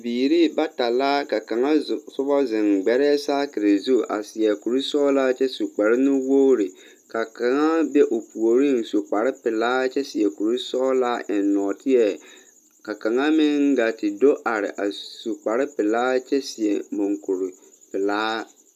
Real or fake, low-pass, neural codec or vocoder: real; 14.4 kHz; none